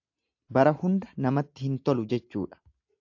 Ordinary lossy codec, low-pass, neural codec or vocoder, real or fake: AAC, 48 kbps; 7.2 kHz; none; real